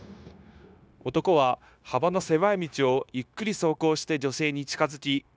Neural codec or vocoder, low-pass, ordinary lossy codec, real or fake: codec, 16 kHz, 0.9 kbps, LongCat-Audio-Codec; none; none; fake